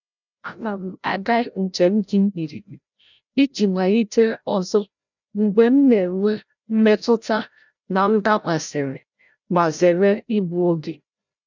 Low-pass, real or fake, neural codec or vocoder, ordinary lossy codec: 7.2 kHz; fake; codec, 16 kHz, 0.5 kbps, FreqCodec, larger model; none